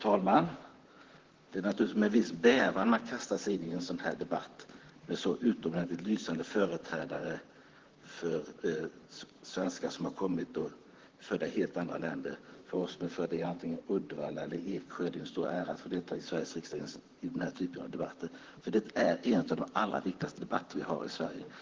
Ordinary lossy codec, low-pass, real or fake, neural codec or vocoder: Opus, 16 kbps; 7.2 kHz; fake; vocoder, 44.1 kHz, 128 mel bands, Pupu-Vocoder